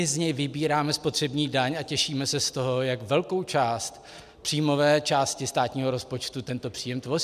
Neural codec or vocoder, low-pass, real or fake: none; 14.4 kHz; real